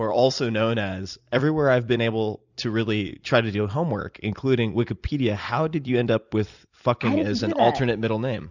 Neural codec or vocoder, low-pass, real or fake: vocoder, 44.1 kHz, 128 mel bands every 256 samples, BigVGAN v2; 7.2 kHz; fake